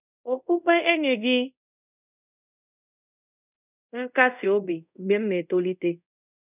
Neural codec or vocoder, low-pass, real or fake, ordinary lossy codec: codec, 24 kHz, 0.5 kbps, DualCodec; 3.6 kHz; fake; none